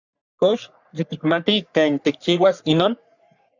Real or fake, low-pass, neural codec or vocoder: fake; 7.2 kHz; codec, 44.1 kHz, 3.4 kbps, Pupu-Codec